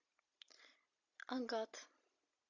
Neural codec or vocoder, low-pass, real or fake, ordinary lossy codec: none; 7.2 kHz; real; AAC, 48 kbps